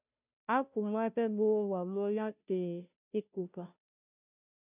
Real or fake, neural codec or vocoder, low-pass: fake; codec, 16 kHz, 0.5 kbps, FunCodec, trained on Chinese and English, 25 frames a second; 3.6 kHz